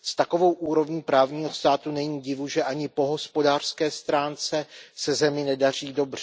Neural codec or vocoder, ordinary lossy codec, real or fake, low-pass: none; none; real; none